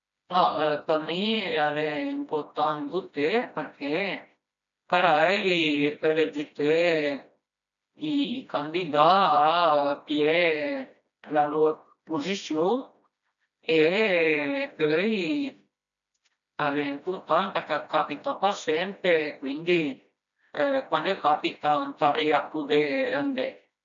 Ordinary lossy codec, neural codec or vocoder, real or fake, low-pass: none; codec, 16 kHz, 1 kbps, FreqCodec, smaller model; fake; 7.2 kHz